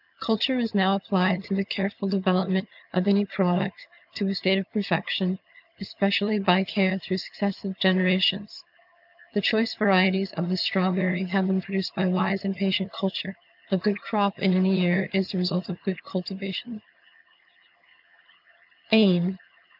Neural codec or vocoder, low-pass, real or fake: vocoder, 22.05 kHz, 80 mel bands, HiFi-GAN; 5.4 kHz; fake